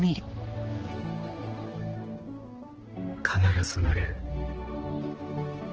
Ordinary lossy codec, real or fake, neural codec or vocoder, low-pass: Opus, 16 kbps; fake; codec, 16 kHz, 2 kbps, X-Codec, HuBERT features, trained on balanced general audio; 7.2 kHz